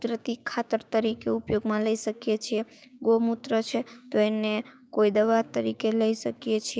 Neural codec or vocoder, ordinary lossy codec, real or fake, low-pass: codec, 16 kHz, 6 kbps, DAC; none; fake; none